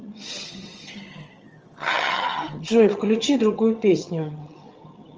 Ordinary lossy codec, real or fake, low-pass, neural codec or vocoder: Opus, 24 kbps; fake; 7.2 kHz; vocoder, 22.05 kHz, 80 mel bands, HiFi-GAN